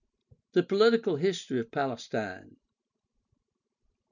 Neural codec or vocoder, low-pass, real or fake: none; 7.2 kHz; real